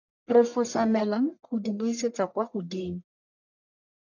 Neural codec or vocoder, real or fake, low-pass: codec, 44.1 kHz, 1.7 kbps, Pupu-Codec; fake; 7.2 kHz